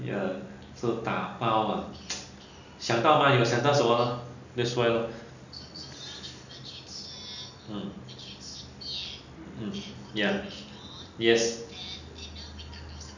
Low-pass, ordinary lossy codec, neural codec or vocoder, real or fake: 7.2 kHz; none; none; real